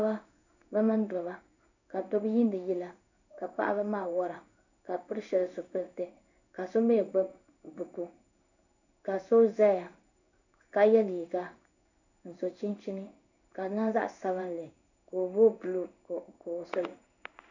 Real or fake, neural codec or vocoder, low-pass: fake; codec, 16 kHz in and 24 kHz out, 1 kbps, XY-Tokenizer; 7.2 kHz